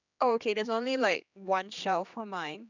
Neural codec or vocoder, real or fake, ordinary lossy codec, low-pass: codec, 16 kHz, 2 kbps, X-Codec, HuBERT features, trained on general audio; fake; none; 7.2 kHz